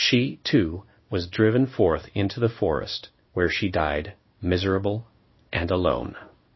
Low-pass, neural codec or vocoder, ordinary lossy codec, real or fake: 7.2 kHz; codec, 16 kHz in and 24 kHz out, 1 kbps, XY-Tokenizer; MP3, 24 kbps; fake